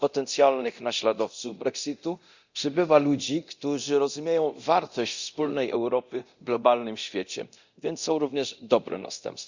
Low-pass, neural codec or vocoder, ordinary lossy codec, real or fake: 7.2 kHz; codec, 24 kHz, 0.9 kbps, DualCodec; Opus, 64 kbps; fake